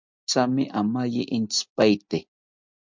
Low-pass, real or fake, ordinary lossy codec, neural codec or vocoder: 7.2 kHz; real; MP3, 64 kbps; none